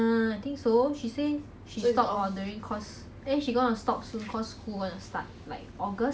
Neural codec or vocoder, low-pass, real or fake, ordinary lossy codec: none; none; real; none